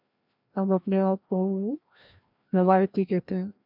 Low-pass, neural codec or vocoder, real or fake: 5.4 kHz; codec, 16 kHz, 1 kbps, FreqCodec, larger model; fake